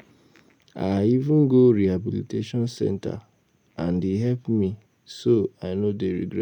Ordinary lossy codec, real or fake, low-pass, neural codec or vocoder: none; real; 19.8 kHz; none